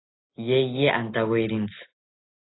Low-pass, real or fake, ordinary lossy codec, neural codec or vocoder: 7.2 kHz; real; AAC, 16 kbps; none